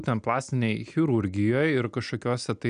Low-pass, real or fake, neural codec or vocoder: 9.9 kHz; real; none